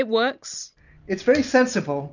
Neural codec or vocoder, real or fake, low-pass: none; real; 7.2 kHz